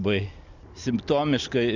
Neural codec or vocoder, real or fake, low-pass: none; real; 7.2 kHz